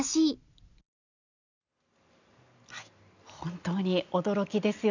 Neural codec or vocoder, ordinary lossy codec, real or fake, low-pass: none; none; real; 7.2 kHz